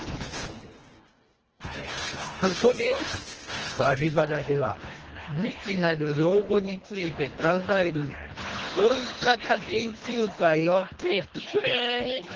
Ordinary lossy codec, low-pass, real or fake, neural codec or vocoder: Opus, 16 kbps; 7.2 kHz; fake; codec, 24 kHz, 1.5 kbps, HILCodec